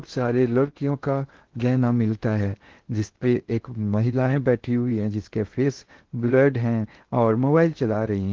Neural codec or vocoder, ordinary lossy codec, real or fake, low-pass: codec, 16 kHz in and 24 kHz out, 0.6 kbps, FocalCodec, streaming, 2048 codes; Opus, 16 kbps; fake; 7.2 kHz